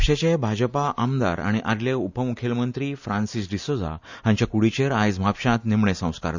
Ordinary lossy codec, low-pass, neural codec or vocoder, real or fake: none; 7.2 kHz; none; real